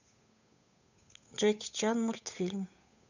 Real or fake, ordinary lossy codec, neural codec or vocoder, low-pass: fake; none; codec, 16 kHz, 8 kbps, FunCodec, trained on Chinese and English, 25 frames a second; 7.2 kHz